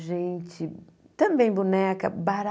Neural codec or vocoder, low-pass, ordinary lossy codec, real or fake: none; none; none; real